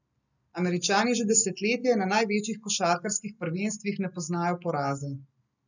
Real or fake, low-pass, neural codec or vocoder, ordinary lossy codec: real; 7.2 kHz; none; none